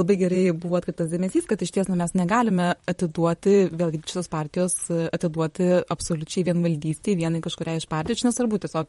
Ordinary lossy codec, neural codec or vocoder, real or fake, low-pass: MP3, 48 kbps; vocoder, 44.1 kHz, 128 mel bands, Pupu-Vocoder; fake; 19.8 kHz